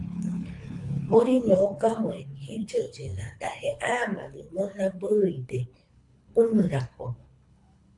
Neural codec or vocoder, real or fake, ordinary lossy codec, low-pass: codec, 24 kHz, 3 kbps, HILCodec; fake; AAC, 64 kbps; 10.8 kHz